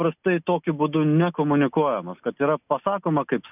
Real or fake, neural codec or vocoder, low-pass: real; none; 3.6 kHz